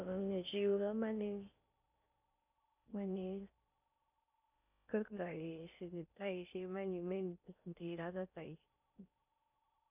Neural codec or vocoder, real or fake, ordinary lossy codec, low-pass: codec, 16 kHz in and 24 kHz out, 0.6 kbps, FocalCodec, streaming, 4096 codes; fake; none; 3.6 kHz